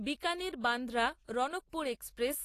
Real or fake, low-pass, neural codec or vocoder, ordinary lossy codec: real; 14.4 kHz; none; AAC, 48 kbps